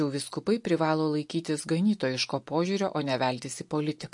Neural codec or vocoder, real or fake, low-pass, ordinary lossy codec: none; real; 10.8 kHz; MP3, 48 kbps